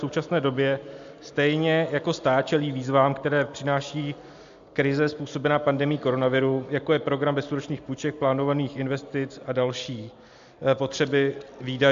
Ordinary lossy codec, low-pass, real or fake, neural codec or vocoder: AAC, 64 kbps; 7.2 kHz; real; none